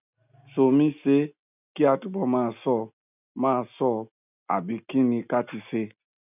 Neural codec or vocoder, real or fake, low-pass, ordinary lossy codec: none; real; 3.6 kHz; none